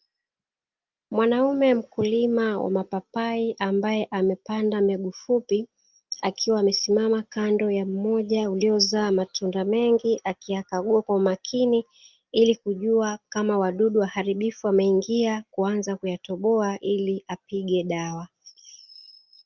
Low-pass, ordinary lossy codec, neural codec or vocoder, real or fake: 7.2 kHz; Opus, 24 kbps; none; real